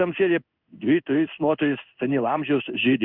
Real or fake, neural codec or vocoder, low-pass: fake; codec, 16 kHz in and 24 kHz out, 1 kbps, XY-Tokenizer; 5.4 kHz